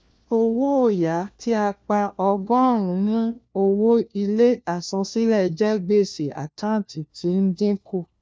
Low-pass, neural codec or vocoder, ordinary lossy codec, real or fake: none; codec, 16 kHz, 1 kbps, FunCodec, trained on LibriTTS, 50 frames a second; none; fake